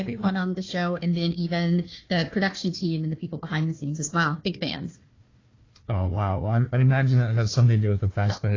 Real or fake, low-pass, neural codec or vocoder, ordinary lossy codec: fake; 7.2 kHz; codec, 16 kHz, 1 kbps, FunCodec, trained on Chinese and English, 50 frames a second; AAC, 32 kbps